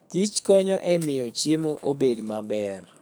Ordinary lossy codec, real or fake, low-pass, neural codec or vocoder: none; fake; none; codec, 44.1 kHz, 2.6 kbps, SNAC